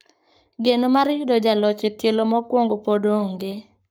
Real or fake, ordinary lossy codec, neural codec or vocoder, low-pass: fake; none; codec, 44.1 kHz, 7.8 kbps, Pupu-Codec; none